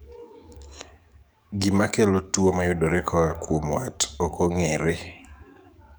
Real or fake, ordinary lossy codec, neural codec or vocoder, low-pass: fake; none; codec, 44.1 kHz, 7.8 kbps, DAC; none